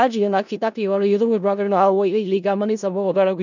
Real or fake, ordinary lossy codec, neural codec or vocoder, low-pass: fake; none; codec, 16 kHz in and 24 kHz out, 0.4 kbps, LongCat-Audio-Codec, four codebook decoder; 7.2 kHz